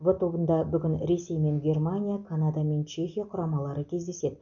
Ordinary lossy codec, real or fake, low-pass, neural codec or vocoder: none; real; 7.2 kHz; none